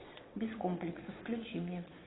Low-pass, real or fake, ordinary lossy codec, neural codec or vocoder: 7.2 kHz; fake; AAC, 16 kbps; vocoder, 22.05 kHz, 80 mel bands, Vocos